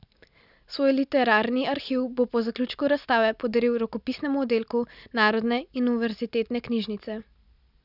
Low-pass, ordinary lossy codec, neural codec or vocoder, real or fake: 5.4 kHz; none; none; real